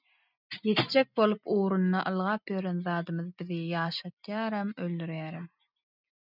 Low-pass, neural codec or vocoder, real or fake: 5.4 kHz; none; real